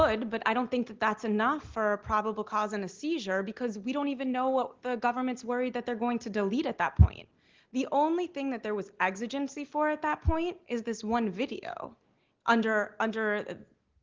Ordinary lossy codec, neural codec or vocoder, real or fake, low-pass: Opus, 16 kbps; none; real; 7.2 kHz